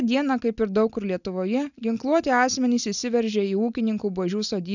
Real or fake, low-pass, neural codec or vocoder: real; 7.2 kHz; none